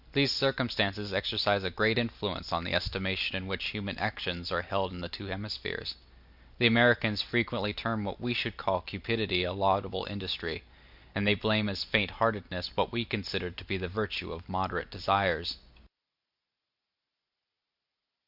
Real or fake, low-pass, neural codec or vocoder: real; 5.4 kHz; none